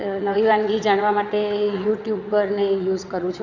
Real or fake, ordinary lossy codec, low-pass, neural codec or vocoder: fake; none; 7.2 kHz; vocoder, 22.05 kHz, 80 mel bands, WaveNeXt